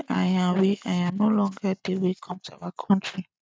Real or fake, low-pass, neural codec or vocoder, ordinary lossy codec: real; none; none; none